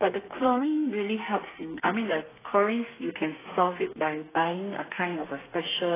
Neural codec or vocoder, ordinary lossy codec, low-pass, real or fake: codec, 32 kHz, 1.9 kbps, SNAC; AAC, 16 kbps; 3.6 kHz; fake